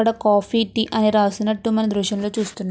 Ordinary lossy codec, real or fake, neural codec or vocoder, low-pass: none; real; none; none